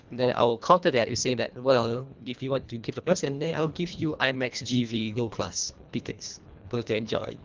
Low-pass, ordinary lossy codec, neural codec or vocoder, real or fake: 7.2 kHz; Opus, 24 kbps; codec, 24 kHz, 1.5 kbps, HILCodec; fake